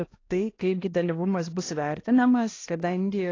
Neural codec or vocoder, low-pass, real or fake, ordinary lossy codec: codec, 16 kHz, 1 kbps, X-Codec, HuBERT features, trained on balanced general audio; 7.2 kHz; fake; AAC, 32 kbps